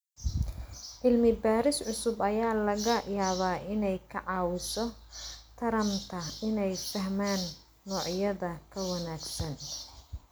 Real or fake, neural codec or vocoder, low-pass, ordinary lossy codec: real; none; none; none